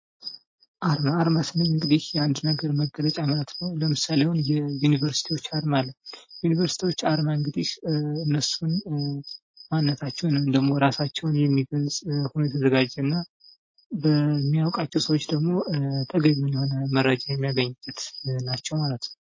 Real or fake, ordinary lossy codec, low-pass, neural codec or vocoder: real; MP3, 32 kbps; 7.2 kHz; none